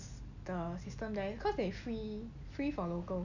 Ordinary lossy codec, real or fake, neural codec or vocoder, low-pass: none; real; none; 7.2 kHz